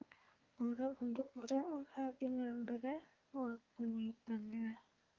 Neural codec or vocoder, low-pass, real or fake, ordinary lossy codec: codec, 24 kHz, 1 kbps, SNAC; 7.2 kHz; fake; Opus, 32 kbps